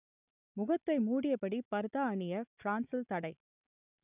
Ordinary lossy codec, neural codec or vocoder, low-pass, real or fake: none; none; 3.6 kHz; real